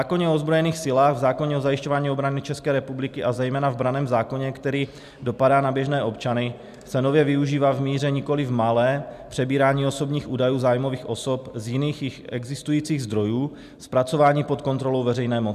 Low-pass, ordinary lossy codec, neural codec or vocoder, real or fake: 14.4 kHz; MP3, 96 kbps; none; real